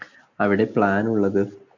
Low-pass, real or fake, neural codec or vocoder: 7.2 kHz; real; none